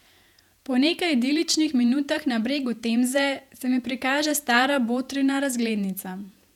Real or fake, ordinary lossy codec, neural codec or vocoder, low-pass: fake; none; vocoder, 48 kHz, 128 mel bands, Vocos; 19.8 kHz